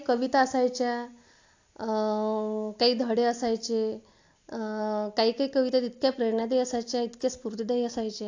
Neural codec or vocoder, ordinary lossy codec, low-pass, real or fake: none; AAC, 48 kbps; 7.2 kHz; real